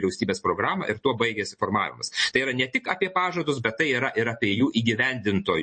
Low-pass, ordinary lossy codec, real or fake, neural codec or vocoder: 9.9 kHz; MP3, 32 kbps; real; none